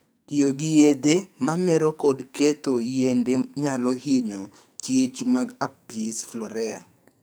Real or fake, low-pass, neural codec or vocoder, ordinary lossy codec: fake; none; codec, 44.1 kHz, 2.6 kbps, SNAC; none